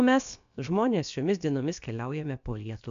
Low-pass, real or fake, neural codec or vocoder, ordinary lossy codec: 7.2 kHz; fake; codec, 16 kHz, about 1 kbps, DyCAST, with the encoder's durations; AAC, 64 kbps